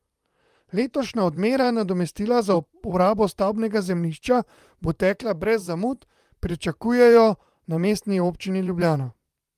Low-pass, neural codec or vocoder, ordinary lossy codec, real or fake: 14.4 kHz; vocoder, 44.1 kHz, 128 mel bands, Pupu-Vocoder; Opus, 32 kbps; fake